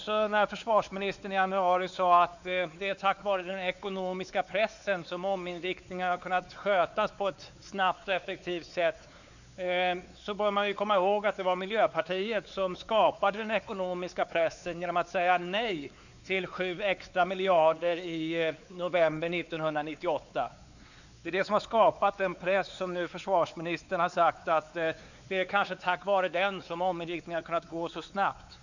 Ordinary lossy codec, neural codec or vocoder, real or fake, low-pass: none; codec, 16 kHz, 4 kbps, X-Codec, WavLM features, trained on Multilingual LibriSpeech; fake; 7.2 kHz